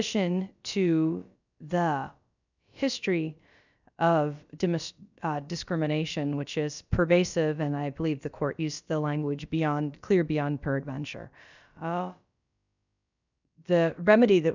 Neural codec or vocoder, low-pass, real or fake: codec, 16 kHz, about 1 kbps, DyCAST, with the encoder's durations; 7.2 kHz; fake